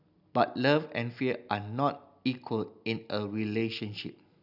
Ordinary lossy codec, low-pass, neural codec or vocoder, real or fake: none; 5.4 kHz; none; real